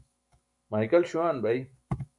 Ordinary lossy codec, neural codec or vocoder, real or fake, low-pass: MP3, 64 kbps; autoencoder, 48 kHz, 128 numbers a frame, DAC-VAE, trained on Japanese speech; fake; 10.8 kHz